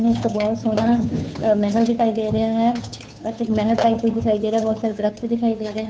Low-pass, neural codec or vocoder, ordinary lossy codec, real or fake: none; codec, 16 kHz, 2 kbps, FunCodec, trained on Chinese and English, 25 frames a second; none; fake